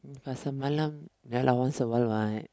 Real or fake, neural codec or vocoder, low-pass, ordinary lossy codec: real; none; none; none